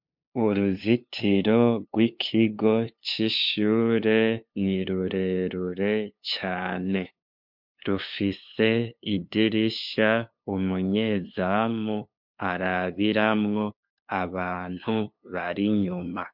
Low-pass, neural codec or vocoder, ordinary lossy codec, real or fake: 5.4 kHz; codec, 16 kHz, 2 kbps, FunCodec, trained on LibriTTS, 25 frames a second; MP3, 48 kbps; fake